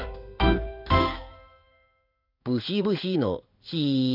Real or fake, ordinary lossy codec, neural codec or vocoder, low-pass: fake; none; codec, 16 kHz in and 24 kHz out, 1 kbps, XY-Tokenizer; 5.4 kHz